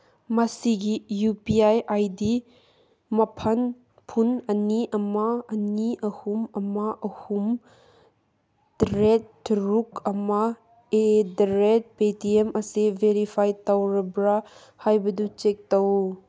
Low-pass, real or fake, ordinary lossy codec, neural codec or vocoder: none; real; none; none